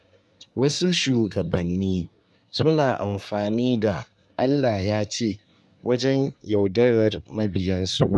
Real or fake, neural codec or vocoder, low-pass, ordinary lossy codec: fake; codec, 24 kHz, 1 kbps, SNAC; none; none